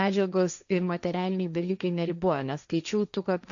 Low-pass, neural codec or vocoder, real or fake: 7.2 kHz; codec, 16 kHz, 1.1 kbps, Voila-Tokenizer; fake